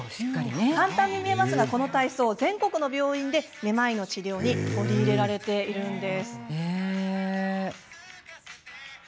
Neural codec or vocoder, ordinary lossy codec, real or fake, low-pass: none; none; real; none